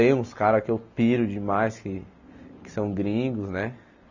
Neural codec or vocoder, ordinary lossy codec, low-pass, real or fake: none; none; 7.2 kHz; real